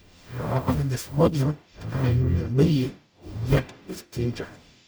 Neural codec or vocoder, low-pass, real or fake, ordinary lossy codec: codec, 44.1 kHz, 0.9 kbps, DAC; none; fake; none